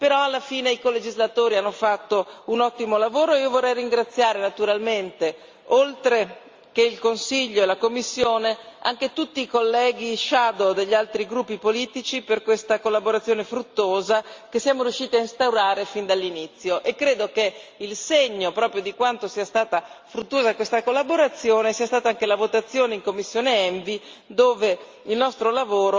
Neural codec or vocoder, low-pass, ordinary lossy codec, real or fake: none; 7.2 kHz; Opus, 32 kbps; real